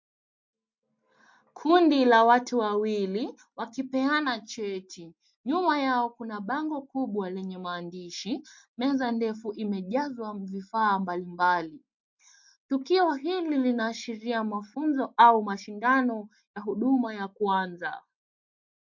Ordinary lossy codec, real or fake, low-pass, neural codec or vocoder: MP3, 48 kbps; real; 7.2 kHz; none